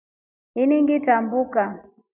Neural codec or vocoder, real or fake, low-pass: none; real; 3.6 kHz